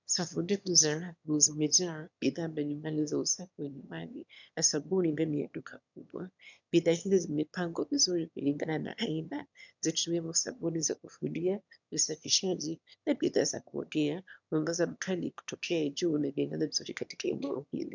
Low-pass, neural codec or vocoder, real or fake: 7.2 kHz; autoencoder, 22.05 kHz, a latent of 192 numbers a frame, VITS, trained on one speaker; fake